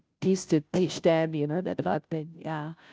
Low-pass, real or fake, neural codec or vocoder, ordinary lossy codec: none; fake; codec, 16 kHz, 0.5 kbps, FunCodec, trained on Chinese and English, 25 frames a second; none